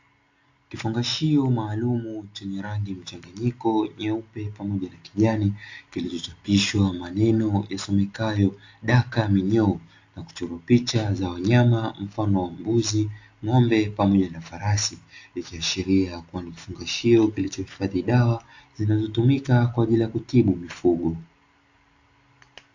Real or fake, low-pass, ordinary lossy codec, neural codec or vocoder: real; 7.2 kHz; AAC, 48 kbps; none